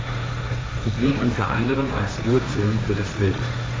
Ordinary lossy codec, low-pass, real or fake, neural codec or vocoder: none; none; fake; codec, 16 kHz, 1.1 kbps, Voila-Tokenizer